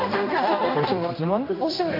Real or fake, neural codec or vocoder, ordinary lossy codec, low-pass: fake; codec, 16 kHz, 1 kbps, X-Codec, HuBERT features, trained on general audio; AAC, 32 kbps; 5.4 kHz